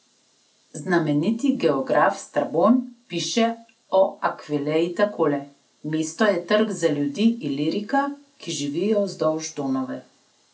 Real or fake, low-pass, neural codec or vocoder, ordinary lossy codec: real; none; none; none